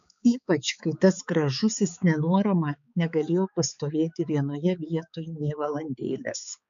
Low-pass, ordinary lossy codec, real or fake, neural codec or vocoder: 7.2 kHz; MP3, 64 kbps; fake; codec, 16 kHz, 4 kbps, X-Codec, HuBERT features, trained on balanced general audio